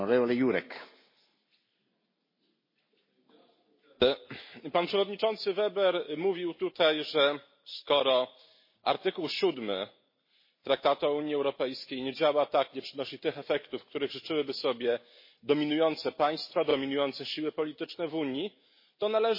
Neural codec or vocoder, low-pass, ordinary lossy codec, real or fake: none; 5.4 kHz; MP3, 24 kbps; real